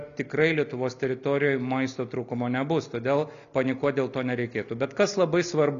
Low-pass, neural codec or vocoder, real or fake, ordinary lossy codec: 7.2 kHz; none; real; MP3, 96 kbps